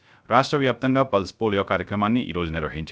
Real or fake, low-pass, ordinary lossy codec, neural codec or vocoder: fake; none; none; codec, 16 kHz, 0.3 kbps, FocalCodec